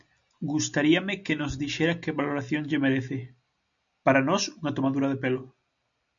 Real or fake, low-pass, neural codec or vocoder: real; 7.2 kHz; none